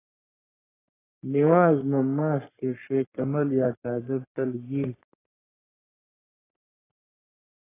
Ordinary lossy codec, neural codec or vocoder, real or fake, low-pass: AAC, 16 kbps; codec, 44.1 kHz, 3.4 kbps, Pupu-Codec; fake; 3.6 kHz